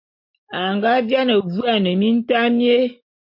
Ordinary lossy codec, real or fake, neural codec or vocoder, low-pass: MP3, 32 kbps; real; none; 5.4 kHz